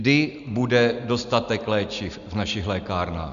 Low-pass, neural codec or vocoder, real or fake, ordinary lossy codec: 7.2 kHz; none; real; AAC, 96 kbps